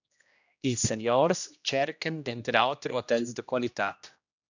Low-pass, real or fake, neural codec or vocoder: 7.2 kHz; fake; codec, 16 kHz, 1 kbps, X-Codec, HuBERT features, trained on general audio